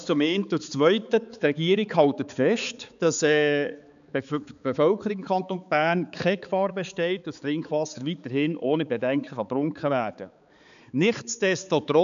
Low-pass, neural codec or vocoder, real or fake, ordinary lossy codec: 7.2 kHz; codec, 16 kHz, 4 kbps, X-Codec, HuBERT features, trained on balanced general audio; fake; MP3, 96 kbps